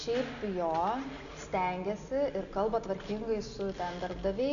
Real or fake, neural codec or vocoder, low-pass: real; none; 7.2 kHz